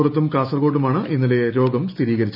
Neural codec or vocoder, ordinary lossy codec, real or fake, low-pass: none; none; real; 5.4 kHz